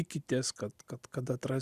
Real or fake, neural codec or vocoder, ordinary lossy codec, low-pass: real; none; Opus, 64 kbps; 14.4 kHz